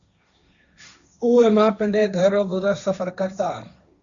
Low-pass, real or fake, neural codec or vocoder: 7.2 kHz; fake; codec, 16 kHz, 1.1 kbps, Voila-Tokenizer